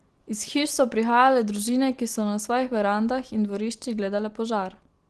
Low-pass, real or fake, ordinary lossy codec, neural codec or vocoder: 10.8 kHz; real; Opus, 16 kbps; none